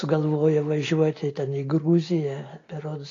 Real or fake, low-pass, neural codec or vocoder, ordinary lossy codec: real; 7.2 kHz; none; AAC, 32 kbps